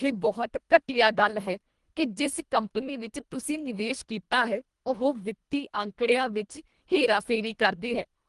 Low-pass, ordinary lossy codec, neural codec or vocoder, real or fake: 10.8 kHz; Opus, 24 kbps; codec, 24 kHz, 1.5 kbps, HILCodec; fake